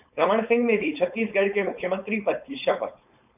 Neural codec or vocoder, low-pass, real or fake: codec, 16 kHz, 4.8 kbps, FACodec; 3.6 kHz; fake